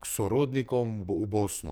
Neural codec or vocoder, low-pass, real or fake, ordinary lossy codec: codec, 44.1 kHz, 2.6 kbps, SNAC; none; fake; none